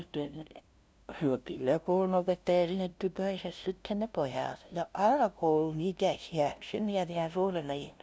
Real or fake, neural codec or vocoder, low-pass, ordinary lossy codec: fake; codec, 16 kHz, 0.5 kbps, FunCodec, trained on LibriTTS, 25 frames a second; none; none